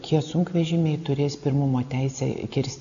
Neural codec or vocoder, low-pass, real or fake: none; 7.2 kHz; real